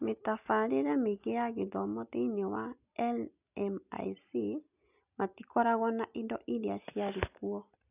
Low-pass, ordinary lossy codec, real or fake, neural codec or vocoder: 3.6 kHz; none; real; none